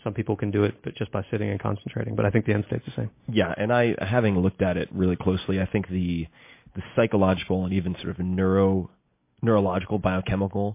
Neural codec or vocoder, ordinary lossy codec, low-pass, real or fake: none; MP3, 24 kbps; 3.6 kHz; real